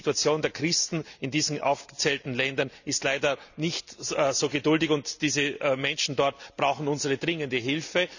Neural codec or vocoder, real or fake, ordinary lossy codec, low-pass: none; real; none; 7.2 kHz